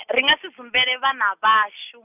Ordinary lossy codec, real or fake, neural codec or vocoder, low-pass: none; real; none; 3.6 kHz